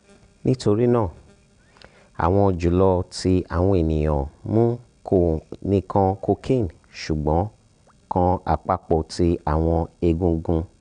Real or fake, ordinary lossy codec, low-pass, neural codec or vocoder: real; none; 9.9 kHz; none